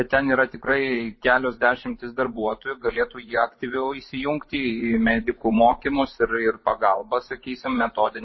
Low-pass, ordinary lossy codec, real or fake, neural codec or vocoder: 7.2 kHz; MP3, 24 kbps; fake; vocoder, 24 kHz, 100 mel bands, Vocos